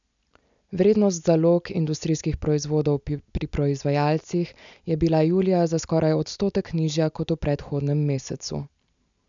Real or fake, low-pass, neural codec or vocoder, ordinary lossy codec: real; 7.2 kHz; none; MP3, 96 kbps